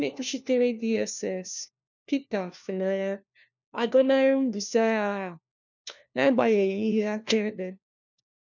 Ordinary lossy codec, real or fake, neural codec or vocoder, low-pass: none; fake; codec, 16 kHz, 1 kbps, FunCodec, trained on LibriTTS, 50 frames a second; 7.2 kHz